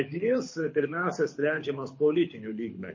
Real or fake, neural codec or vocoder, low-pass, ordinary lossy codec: fake; codec, 24 kHz, 6 kbps, HILCodec; 7.2 kHz; MP3, 32 kbps